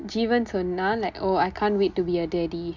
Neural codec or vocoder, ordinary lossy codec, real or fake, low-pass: none; MP3, 64 kbps; real; 7.2 kHz